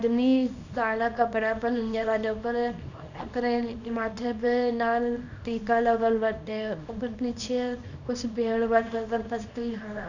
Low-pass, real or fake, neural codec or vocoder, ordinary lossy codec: 7.2 kHz; fake; codec, 24 kHz, 0.9 kbps, WavTokenizer, small release; none